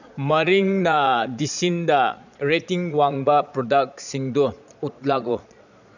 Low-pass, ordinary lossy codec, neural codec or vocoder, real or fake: 7.2 kHz; none; vocoder, 22.05 kHz, 80 mel bands, Vocos; fake